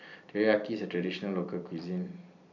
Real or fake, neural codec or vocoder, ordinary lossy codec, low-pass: fake; vocoder, 44.1 kHz, 128 mel bands every 256 samples, BigVGAN v2; none; 7.2 kHz